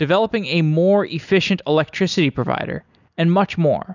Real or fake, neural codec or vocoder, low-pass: real; none; 7.2 kHz